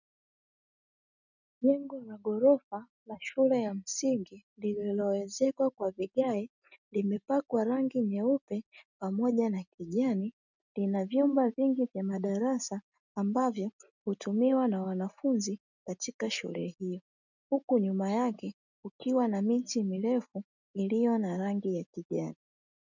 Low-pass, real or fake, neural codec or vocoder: 7.2 kHz; real; none